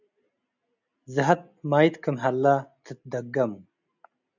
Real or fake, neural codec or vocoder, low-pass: real; none; 7.2 kHz